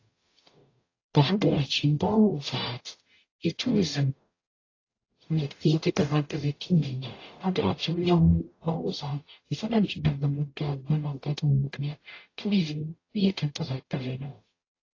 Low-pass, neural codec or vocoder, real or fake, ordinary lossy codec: 7.2 kHz; codec, 44.1 kHz, 0.9 kbps, DAC; fake; AAC, 32 kbps